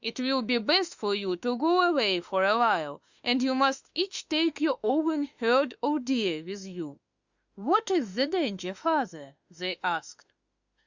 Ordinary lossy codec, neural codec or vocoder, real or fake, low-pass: Opus, 64 kbps; autoencoder, 48 kHz, 32 numbers a frame, DAC-VAE, trained on Japanese speech; fake; 7.2 kHz